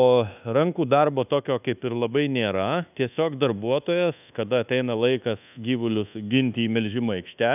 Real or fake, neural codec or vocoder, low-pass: fake; codec, 24 kHz, 1.2 kbps, DualCodec; 3.6 kHz